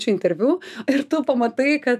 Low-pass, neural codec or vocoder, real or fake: 14.4 kHz; autoencoder, 48 kHz, 128 numbers a frame, DAC-VAE, trained on Japanese speech; fake